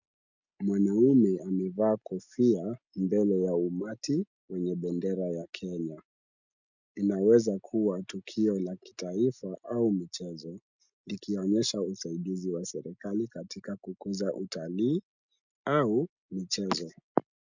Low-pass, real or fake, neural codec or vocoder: 7.2 kHz; real; none